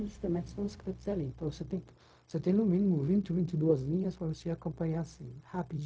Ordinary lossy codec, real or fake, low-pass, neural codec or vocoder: none; fake; none; codec, 16 kHz, 0.4 kbps, LongCat-Audio-Codec